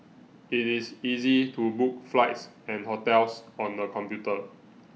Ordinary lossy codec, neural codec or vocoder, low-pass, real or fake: none; none; none; real